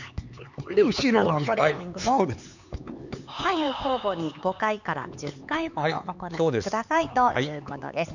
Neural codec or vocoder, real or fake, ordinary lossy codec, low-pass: codec, 16 kHz, 4 kbps, X-Codec, HuBERT features, trained on LibriSpeech; fake; none; 7.2 kHz